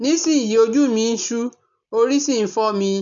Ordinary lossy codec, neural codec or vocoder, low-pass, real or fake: none; none; 7.2 kHz; real